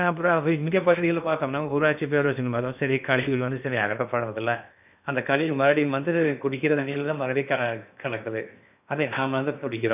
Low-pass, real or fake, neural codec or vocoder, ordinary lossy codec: 3.6 kHz; fake; codec, 16 kHz in and 24 kHz out, 0.8 kbps, FocalCodec, streaming, 65536 codes; none